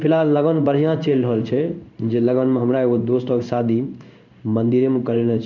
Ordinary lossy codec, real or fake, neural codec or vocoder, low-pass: none; fake; codec, 16 kHz in and 24 kHz out, 1 kbps, XY-Tokenizer; 7.2 kHz